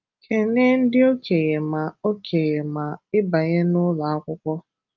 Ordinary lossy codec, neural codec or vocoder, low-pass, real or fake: Opus, 24 kbps; none; 7.2 kHz; real